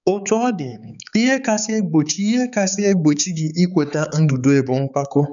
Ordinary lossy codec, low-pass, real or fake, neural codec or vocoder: none; 7.2 kHz; fake; codec, 16 kHz, 4 kbps, X-Codec, HuBERT features, trained on balanced general audio